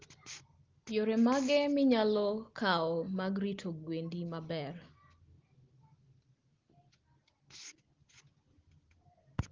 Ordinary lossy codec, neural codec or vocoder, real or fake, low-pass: Opus, 16 kbps; none; real; 7.2 kHz